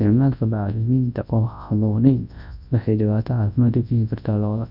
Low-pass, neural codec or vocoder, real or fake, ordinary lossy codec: 5.4 kHz; codec, 24 kHz, 0.9 kbps, WavTokenizer, large speech release; fake; none